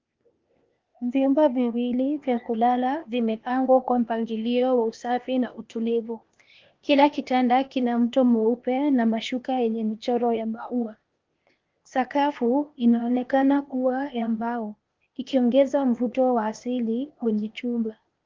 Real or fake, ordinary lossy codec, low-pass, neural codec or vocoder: fake; Opus, 32 kbps; 7.2 kHz; codec, 16 kHz, 0.8 kbps, ZipCodec